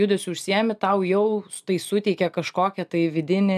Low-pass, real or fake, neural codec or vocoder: 14.4 kHz; real; none